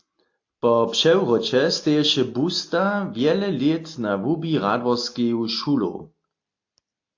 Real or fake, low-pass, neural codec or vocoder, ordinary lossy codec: real; 7.2 kHz; none; AAC, 48 kbps